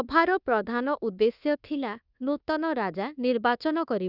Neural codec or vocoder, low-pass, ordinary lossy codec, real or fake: codec, 24 kHz, 1.2 kbps, DualCodec; 5.4 kHz; Opus, 64 kbps; fake